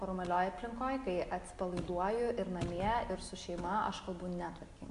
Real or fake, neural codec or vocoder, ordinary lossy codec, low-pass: real; none; AAC, 96 kbps; 10.8 kHz